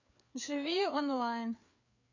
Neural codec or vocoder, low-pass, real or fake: codec, 16 kHz, 4 kbps, FreqCodec, larger model; 7.2 kHz; fake